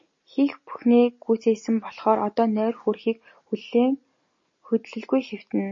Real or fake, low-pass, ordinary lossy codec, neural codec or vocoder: real; 7.2 kHz; MP3, 32 kbps; none